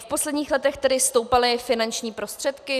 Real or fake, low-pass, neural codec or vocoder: real; 14.4 kHz; none